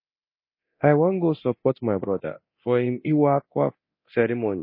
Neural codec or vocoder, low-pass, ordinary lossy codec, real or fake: codec, 24 kHz, 0.9 kbps, DualCodec; 5.4 kHz; MP3, 24 kbps; fake